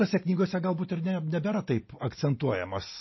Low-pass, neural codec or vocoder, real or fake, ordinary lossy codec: 7.2 kHz; none; real; MP3, 24 kbps